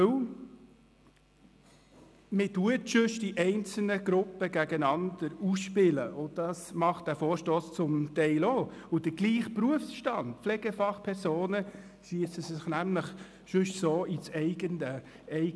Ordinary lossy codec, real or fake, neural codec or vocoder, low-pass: none; real; none; none